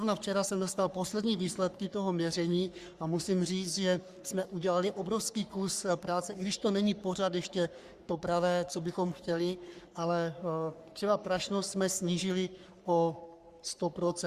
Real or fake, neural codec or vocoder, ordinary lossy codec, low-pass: fake; codec, 44.1 kHz, 3.4 kbps, Pupu-Codec; Opus, 64 kbps; 14.4 kHz